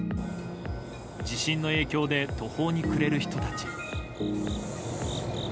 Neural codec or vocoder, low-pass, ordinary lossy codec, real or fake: none; none; none; real